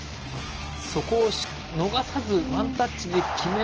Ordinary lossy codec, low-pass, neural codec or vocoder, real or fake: Opus, 16 kbps; 7.2 kHz; none; real